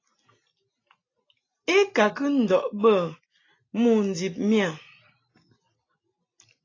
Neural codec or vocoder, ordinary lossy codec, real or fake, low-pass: none; AAC, 32 kbps; real; 7.2 kHz